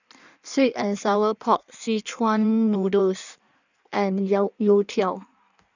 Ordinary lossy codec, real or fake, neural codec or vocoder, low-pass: none; fake; codec, 16 kHz in and 24 kHz out, 1.1 kbps, FireRedTTS-2 codec; 7.2 kHz